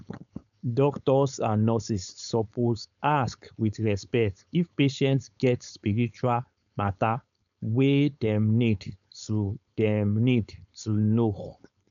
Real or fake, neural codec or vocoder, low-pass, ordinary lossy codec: fake; codec, 16 kHz, 4.8 kbps, FACodec; 7.2 kHz; MP3, 96 kbps